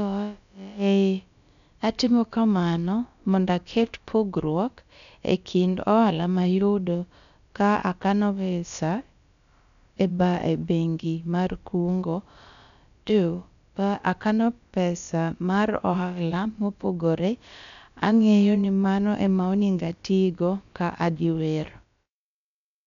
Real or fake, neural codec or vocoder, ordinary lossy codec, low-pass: fake; codec, 16 kHz, about 1 kbps, DyCAST, with the encoder's durations; MP3, 96 kbps; 7.2 kHz